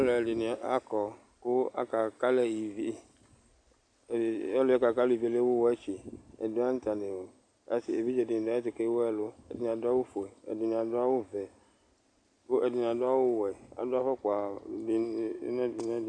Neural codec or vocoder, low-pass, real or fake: none; 9.9 kHz; real